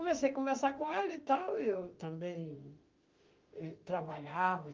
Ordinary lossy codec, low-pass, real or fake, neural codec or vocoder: Opus, 24 kbps; 7.2 kHz; fake; autoencoder, 48 kHz, 32 numbers a frame, DAC-VAE, trained on Japanese speech